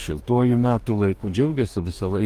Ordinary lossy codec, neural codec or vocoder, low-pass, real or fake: Opus, 32 kbps; codec, 44.1 kHz, 2.6 kbps, DAC; 14.4 kHz; fake